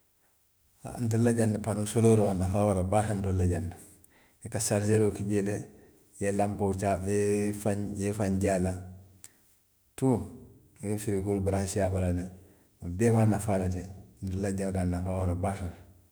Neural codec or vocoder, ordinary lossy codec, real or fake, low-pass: autoencoder, 48 kHz, 32 numbers a frame, DAC-VAE, trained on Japanese speech; none; fake; none